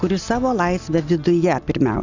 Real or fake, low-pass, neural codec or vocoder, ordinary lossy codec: real; 7.2 kHz; none; Opus, 64 kbps